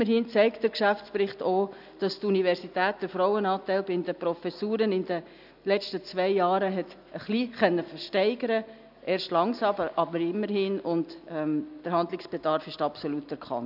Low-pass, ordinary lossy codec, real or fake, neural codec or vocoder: 5.4 kHz; none; real; none